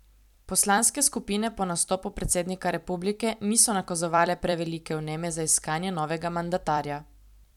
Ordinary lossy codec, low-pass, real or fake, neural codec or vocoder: none; 19.8 kHz; fake; vocoder, 44.1 kHz, 128 mel bands every 256 samples, BigVGAN v2